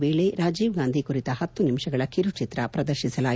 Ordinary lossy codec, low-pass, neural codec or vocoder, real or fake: none; none; none; real